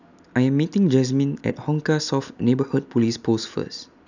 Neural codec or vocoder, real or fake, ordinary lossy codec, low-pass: none; real; none; 7.2 kHz